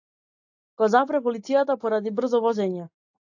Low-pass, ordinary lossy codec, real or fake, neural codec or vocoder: 7.2 kHz; AAC, 48 kbps; real; none